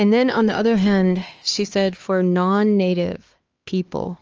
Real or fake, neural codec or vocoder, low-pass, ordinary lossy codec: fake; codec, 16 kHz, 2 kbps, X-Codec, HuBERT features, trained on LibriSpeech; 7.2 kHz; Opus, 24 kbps